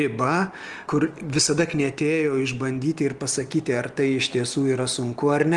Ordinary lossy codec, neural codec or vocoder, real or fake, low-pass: Opus, 24 kbps; none; real; 10.8 kHz